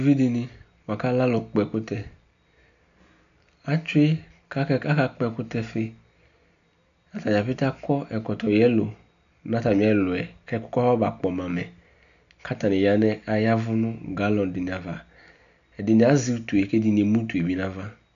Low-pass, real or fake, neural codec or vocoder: 7.2 kHz; real; none